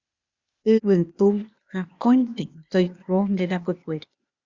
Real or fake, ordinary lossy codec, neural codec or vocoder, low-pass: fake; Opus, 64 kbps; codec, 16 kHz, 0.8 kbps, ZipCodec; 7.2 kHz